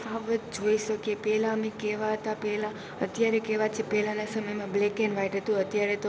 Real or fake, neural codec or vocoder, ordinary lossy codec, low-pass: real; none; none; none